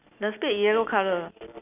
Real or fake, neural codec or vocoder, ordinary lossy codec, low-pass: real; none; none; 3.6 kHz